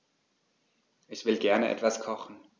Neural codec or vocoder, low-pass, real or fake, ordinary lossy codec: none; none; real; none